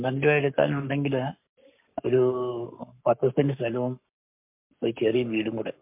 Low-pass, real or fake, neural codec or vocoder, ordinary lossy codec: 3.6 kHz; fake; codec, 44.1 kHz, 7.8 kbps, Pupu-Codec; MP3, 32 kbps